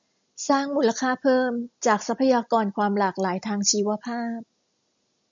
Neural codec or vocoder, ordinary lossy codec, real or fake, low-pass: none; MP3, 64 kbps; real; 7.2 kHz